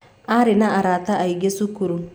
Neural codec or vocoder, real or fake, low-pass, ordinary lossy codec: none; real; none; none